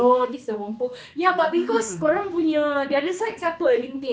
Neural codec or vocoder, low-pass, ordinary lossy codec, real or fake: codec, 16 kHz, 2 kbps, X-Codec, HuBERT features, trained on balanced general audio; none; none; fake